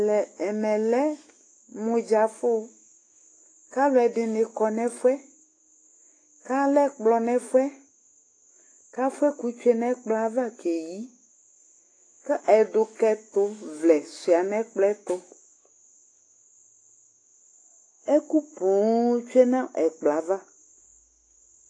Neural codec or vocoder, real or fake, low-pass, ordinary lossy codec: autoencoder, 48 kHz, 128 numbers a frame, DAC-VAE, trained on Japanese speech; fake; 9.9 kHz; AAC, 32 kbps